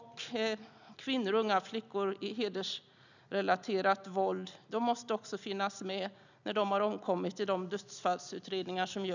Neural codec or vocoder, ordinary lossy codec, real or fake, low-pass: none; none; real; 7.2 kHz